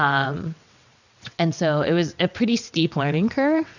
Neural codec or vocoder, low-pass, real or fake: vocoder, 22.05 kHz, 80 mel bands, WaveNeXt; 7.2 kHz; fake